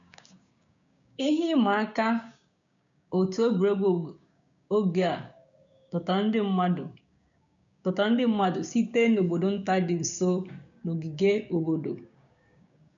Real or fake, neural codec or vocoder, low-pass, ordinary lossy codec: fake; codec, 16 kHz, 6 kbps, DAC; 7.2 kHz; none